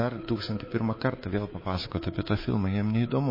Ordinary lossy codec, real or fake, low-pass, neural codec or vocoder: MP3, 24 kbps; fake; 5.4 kHz; codec, 24 kHz, 3.1 kbps, DualCodec